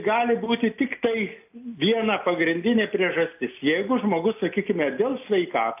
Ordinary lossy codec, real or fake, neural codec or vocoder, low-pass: AAC, 32 kbps; real; none; 3.6 kHz